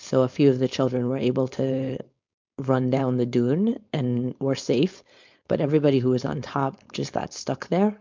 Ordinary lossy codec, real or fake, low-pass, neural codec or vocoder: MP3, 64 kbps; fake; 7.2 kHz; codec, 16 kHz, 4.8 kbps, FACodec